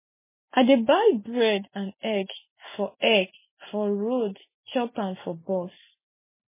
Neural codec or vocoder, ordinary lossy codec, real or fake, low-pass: none; MP3, 16 kbps; real; 3.6 kHz